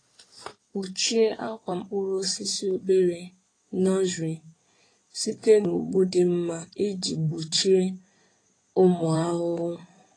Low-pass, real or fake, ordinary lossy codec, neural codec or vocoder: 9.9 kHz; fake; AAC, 32 kbps; codec, 16 kHz in and 24 kHz out, 2.2 kbps, FireRedTTS-2 codec